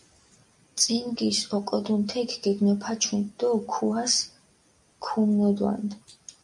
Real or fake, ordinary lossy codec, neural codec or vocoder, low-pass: real; MP3, 96 kbps; none; 10.8 kHz